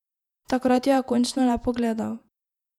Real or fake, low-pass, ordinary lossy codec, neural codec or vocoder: fake; 19.8 kHz; none; vocoder, 48 kHz, 128 mel bands, Vocos